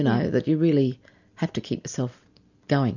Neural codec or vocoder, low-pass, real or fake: none; 7.2 kHz; real